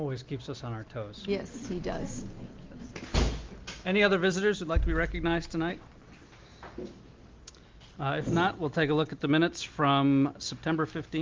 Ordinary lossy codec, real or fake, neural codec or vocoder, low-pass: Opus, 32 kbps; real; none; 7.2 kHz